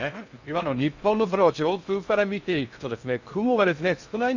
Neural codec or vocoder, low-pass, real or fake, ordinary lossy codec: codec, 16 kHz in and 24 kHz out, 0.6 kbps, FocalCodec, streaming, 2048 codes; 7.2 kHz; fake; none